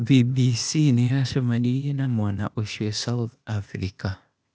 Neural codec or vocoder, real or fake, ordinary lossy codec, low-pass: codec, 16 kHz, 0.8 kbps, ZipCodec; fake; none; none